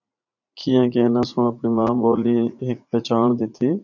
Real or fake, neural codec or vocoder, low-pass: fake; vocoder, 44.1 kHz, 80 mel bands, Vocos; 7.2 kHz